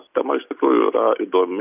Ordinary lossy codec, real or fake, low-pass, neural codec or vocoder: AAC, 32 kbps; real; 3.6 kHz; none